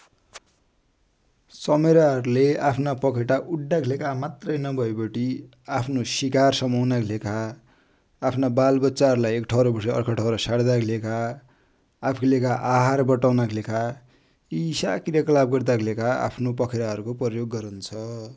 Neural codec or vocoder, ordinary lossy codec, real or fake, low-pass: none; none; real; none